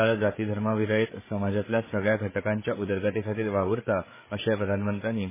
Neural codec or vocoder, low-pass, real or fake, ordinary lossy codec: codec, 16 kHz, 8 kbps, FreqCodec, larger model; 3.6 kHz; fake; MP3, 16 kbps